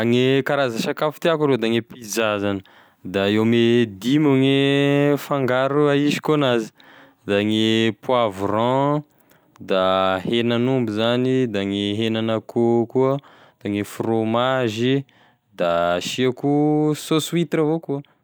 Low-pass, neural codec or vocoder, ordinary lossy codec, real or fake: none; none; none; real